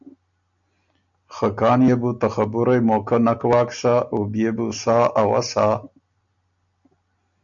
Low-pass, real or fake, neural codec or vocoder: 7.2 kHz; real; none